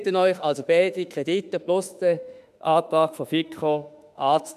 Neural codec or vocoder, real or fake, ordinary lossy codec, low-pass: autoencoder, 48 kHz, 32 numbers a frame, DAC-VAE, trained on Japanese speech; fake; none; 14.4 kHz